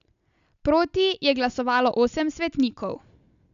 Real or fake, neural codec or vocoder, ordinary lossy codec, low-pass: real; none; none; 7.2 kHz